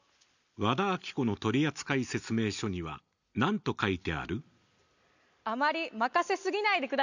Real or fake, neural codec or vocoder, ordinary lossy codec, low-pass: real; none; none; 7.2 kHz